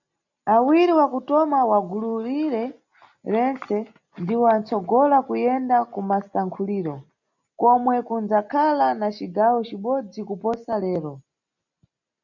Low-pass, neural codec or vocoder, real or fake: 7.2 kHz; none; real